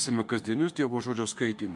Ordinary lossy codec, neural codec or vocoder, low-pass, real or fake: MP3, 64 kbps; autoencoder, 48 kHz, 32 numbers a frame, DAC-VAE, trained on Japanese speech; 10.8 kHz; fake